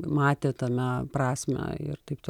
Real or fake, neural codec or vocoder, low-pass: real; none; 19.8 kHz